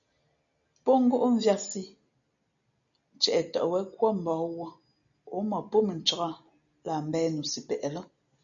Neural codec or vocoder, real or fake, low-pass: none; real; 7.2 kHz